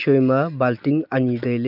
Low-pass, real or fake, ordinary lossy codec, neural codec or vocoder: 5.4 kHz; real; none; none